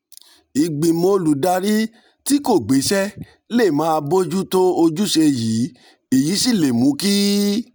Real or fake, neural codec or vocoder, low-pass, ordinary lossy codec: real; none; none; none